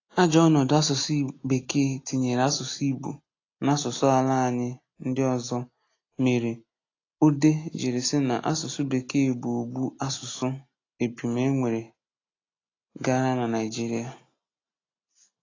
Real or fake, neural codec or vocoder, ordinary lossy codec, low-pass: real; none; AAC, 32 kbps; 7.2 kHz